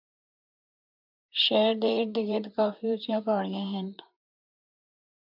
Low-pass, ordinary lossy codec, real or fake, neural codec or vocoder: 5.4 kHz; AAC, 48 kbps; fake; vocoder, 44.1 kHz, 128 mel bands, Pupu-Vocoder